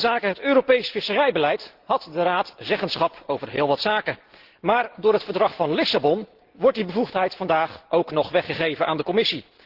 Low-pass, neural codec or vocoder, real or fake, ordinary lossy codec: 5.4 kHz; none; real; Opus, 16 kbps